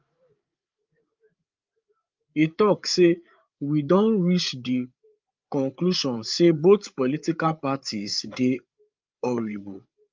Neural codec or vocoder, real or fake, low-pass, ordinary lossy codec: codec, 16 kHz, 8 kbps, FreqCodec, larger model; fake; 7.2 kHz; Opus, 32 kbps